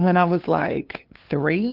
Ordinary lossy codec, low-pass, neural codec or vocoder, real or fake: Opus, 32 kbps; 5.4 kHz; codec, 16 kHz, 2 kbps, FunCodec, trained on LibriTTS, 25 frames a second; fake